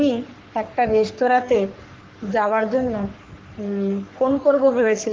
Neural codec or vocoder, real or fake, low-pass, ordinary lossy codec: codec, 44.1 kHz, 3.4 kbps, Pupu-Codec; fake; 7.2 kHz; Opus, 16 kbps